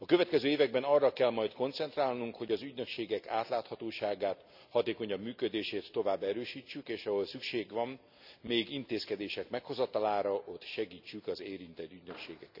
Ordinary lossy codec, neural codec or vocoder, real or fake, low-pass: none; none; real; 5.4 kHz